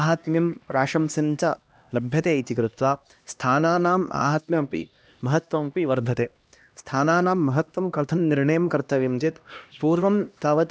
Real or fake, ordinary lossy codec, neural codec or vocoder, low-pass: fake; none; codec, 16 kHz, 1 kbps, X-Codec, HuBERT features, trained on LibriSpeech; none